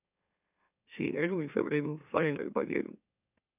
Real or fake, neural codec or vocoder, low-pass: fake; autoencoder, 44.1 kHz, a latent of 192 numbers a frame, MeloTTS; 3.6 kHz